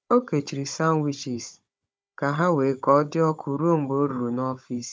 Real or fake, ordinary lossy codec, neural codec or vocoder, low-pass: fake; none; codec, 16 kHz, 16 kbps, FunCodec, trained on Chinese and English, 50 frames a second; none